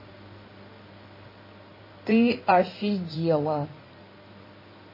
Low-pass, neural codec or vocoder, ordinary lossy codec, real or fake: 5.4 kHz; codec, 16 kHz in and 24 kHz out, 2.2 kbps, FireRedTTS-2 codec; MP3, 24 kbps; fake